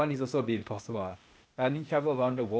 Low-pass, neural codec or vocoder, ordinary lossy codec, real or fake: none; codec, 16 kHz, 0.8 kbps, ZipCodec; none; fake